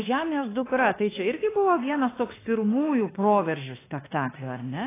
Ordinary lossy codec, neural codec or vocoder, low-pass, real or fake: AAC, 16 kbps; autoencoder, 48 kHz, 32 numbers a frame, DAC-VAE, trained on Japanese speech; 3.6 kHz; fake